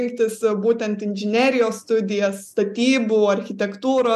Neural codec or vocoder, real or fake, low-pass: none; real; 14.4 kHz